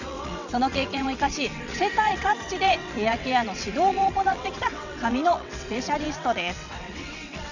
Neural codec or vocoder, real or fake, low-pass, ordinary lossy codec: vocoder, 22.05 kHz, 80 mel bands, WaveNeXt; fake; 7.2 kHz; none